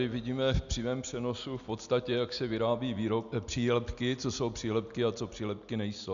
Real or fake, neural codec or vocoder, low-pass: real; none; 7.2 kHz